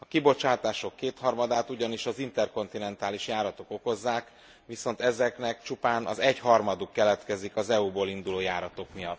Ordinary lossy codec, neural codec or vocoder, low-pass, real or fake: none; none; none; real